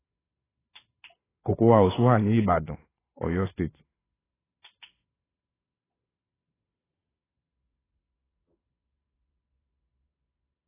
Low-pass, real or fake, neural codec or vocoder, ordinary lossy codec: 3.6 kHz; fake; vocoder, 22.05 kHz, 80 mel bands, Vocos; AAC, 16 kbps